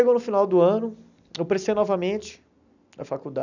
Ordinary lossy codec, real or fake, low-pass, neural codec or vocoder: none; real; 7.2 kHz; none